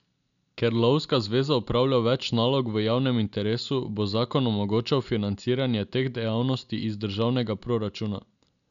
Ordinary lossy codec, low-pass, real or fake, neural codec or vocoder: none; 7.2 kHz; real; none